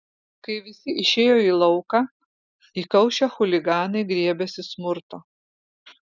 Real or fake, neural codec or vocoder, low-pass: real; none; 7.2 kHz